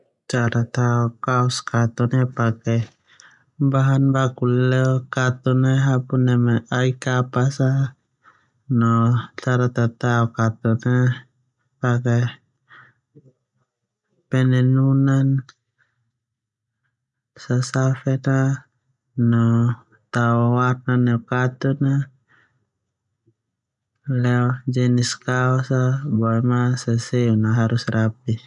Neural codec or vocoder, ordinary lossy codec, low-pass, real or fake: none; none; 10.8 kHz; real